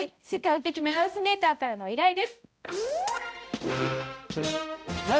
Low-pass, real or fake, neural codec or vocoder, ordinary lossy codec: none; fake; codec, 16 kHz, 0.5 kbps, X-Codec, HuBERT features, trained on balanced general audio; none